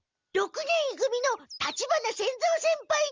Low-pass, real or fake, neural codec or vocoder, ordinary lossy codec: 7.2 kHz; real; none; Opus, 32 kbps